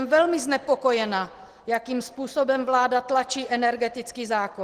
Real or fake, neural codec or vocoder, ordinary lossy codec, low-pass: real; none; Opus, 16 kbps; 14.4 kHz